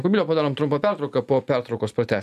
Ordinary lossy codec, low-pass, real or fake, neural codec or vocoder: AAC, 96 kbps; 14.4 kHz; real; none